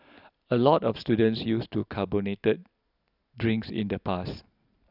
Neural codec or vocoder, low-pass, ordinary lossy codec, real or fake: none; 5.4 kHz; none; real